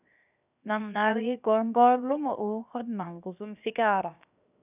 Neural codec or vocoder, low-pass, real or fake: codec, 16 kHz, 0.7 kbps, FocalCodec; 3.6 kHz; fake